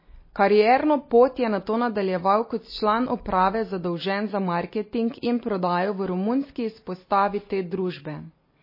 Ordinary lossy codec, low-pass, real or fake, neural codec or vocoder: MP3, 24 kbps; 5.4 kHz; real; none